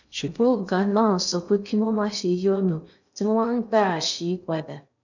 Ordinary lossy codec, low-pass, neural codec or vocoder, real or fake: none; 7.2 kHz; codec, 16 kHz in and 24 kHz out, 0.6 kbps, FocalCodec, streaming, 2048 codes; fake